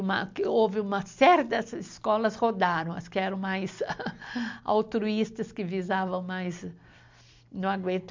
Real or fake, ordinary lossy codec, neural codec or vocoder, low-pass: real; MP3, 64 kbps; none; 7.2 kHz